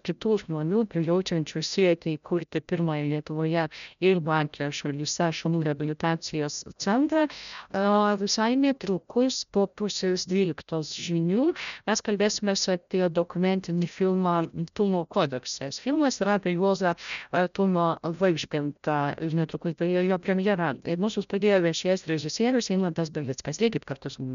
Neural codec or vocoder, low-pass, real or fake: codec, 16 kHz, 0.5 kbps, FreqCodec, larger model; 7.2 kHz; fake